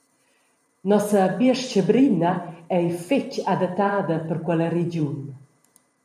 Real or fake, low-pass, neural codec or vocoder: fake; 14.4 kHz; vocoder, 44.1 kHz, 128 mel bands every 512 samples, BigVGAN v2